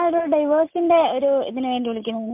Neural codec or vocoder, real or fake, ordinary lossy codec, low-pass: none; real; MP3, 32 kbps; 3.6 kHz